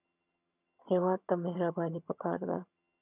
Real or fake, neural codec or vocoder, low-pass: fake; vocoder, 22.05 kHz, 80 mel bands, HiFi-GAN; 3.6 kHz